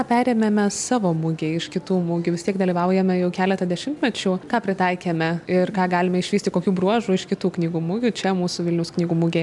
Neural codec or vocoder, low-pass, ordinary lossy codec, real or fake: none; 10.8 kHz; MP3, 96 kbps; real